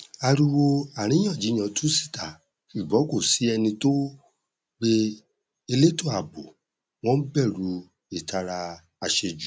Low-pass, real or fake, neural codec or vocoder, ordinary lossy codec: none; real; none; none